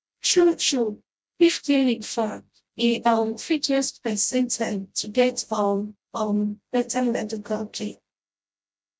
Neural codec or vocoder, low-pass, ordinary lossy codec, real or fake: codec, 16 kHz, 0.5 kbps, FreqCodec, smaller model; none; none; fake